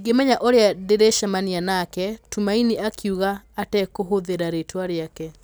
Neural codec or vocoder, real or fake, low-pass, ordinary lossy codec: none; real; none; none